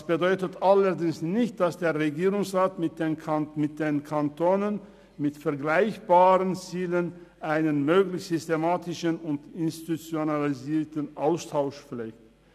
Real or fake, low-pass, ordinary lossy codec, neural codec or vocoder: real; 14.4 kHz; none; none